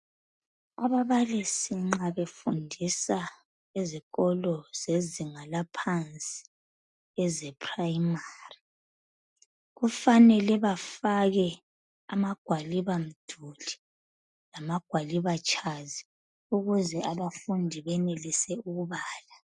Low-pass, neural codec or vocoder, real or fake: 10.8 kHz; none; real